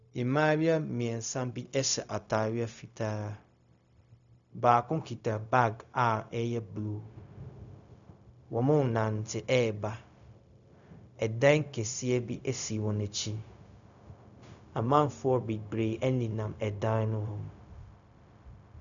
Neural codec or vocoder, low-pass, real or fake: codec, 16 kHz, 0.4 kbps, LongCat-Audio-Codec; 7.2 kHz; fake